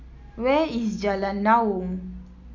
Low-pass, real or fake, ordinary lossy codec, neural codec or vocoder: 7.2 kHz; real; none; none